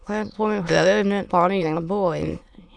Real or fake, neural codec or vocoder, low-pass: fake; autoencoder, 22.05 kHz, a latent of 192 numbers a frame, VITS, trained on many speakers; 9.9 kHz